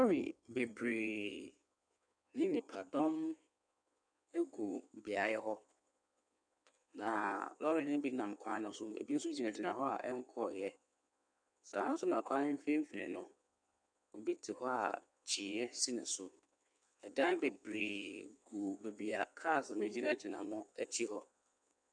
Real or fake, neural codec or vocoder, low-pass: fake; codec, 16 kHz in and 24 kHz out, 1.1 kbps, FireRedTTS-2 codec; 9.9 kHz